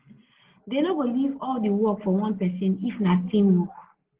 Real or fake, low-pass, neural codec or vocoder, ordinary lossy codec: real; 3.6 kHz; none; Opus, 16 kbps